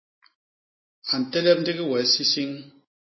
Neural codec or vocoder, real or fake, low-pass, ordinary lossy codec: none; real; 7.2 kHz; MP3, 24 kbps